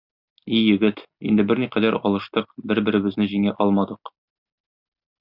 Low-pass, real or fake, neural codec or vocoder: 5.4 kHz; real; none